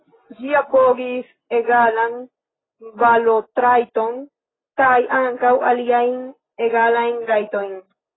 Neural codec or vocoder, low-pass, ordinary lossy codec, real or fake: none; 7.2 kHz; AAC, 16 kbps; real